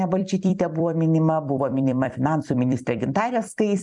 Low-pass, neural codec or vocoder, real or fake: 10.8 kHz; vocoder, 44.1 kHz, 128 mel bands every 256 samples, BigVGAN v2; fake